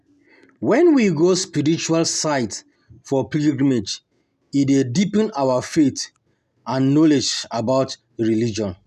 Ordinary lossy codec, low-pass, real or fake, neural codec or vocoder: none; 14.4 kHz; real; none